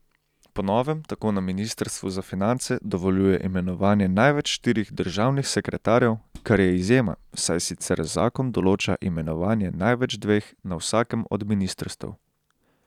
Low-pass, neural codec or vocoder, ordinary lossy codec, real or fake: 19.8 kHz; none; none; real